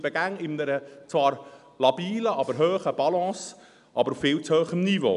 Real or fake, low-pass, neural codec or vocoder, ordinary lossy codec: real; 10.8 kHz; none; none